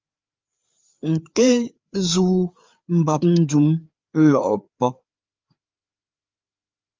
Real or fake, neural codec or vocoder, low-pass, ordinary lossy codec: fake; codec, 16 kHz, 4 kbps, FreqCodec, larger model; 7.2 kHz; Opus, 32 kbps